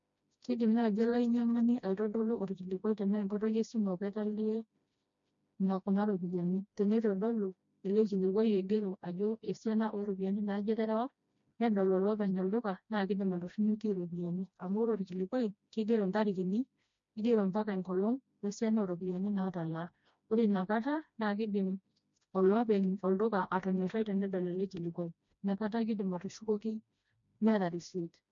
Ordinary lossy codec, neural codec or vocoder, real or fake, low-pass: MP3, 48 kbps; codec, 16 kHz, 1 kbps, FreqCodec, smaller model; fake; 7.2 kHz